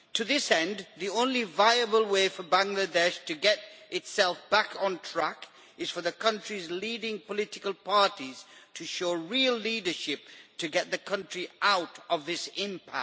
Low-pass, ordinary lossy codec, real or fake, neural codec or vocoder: none; none; real; none